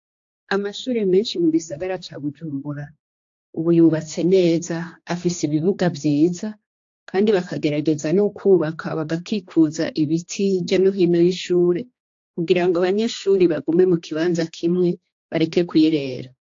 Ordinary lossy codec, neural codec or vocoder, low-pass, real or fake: AAC, 48 kbps; codec, 16 kHz, 2 kbps, X-Codec, HuBERT features, trained on general audio; 7.2 kHz; fake